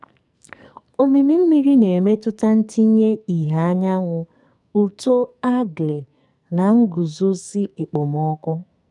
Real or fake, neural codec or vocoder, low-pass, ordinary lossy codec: fake; codec, 44.1 kHz, 2.6 kbps, SNAC; 10.8 kHz; none